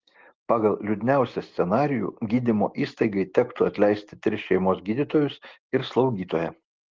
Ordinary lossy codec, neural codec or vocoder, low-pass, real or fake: Opus, 16 kbps; none; 7.2 kHz; real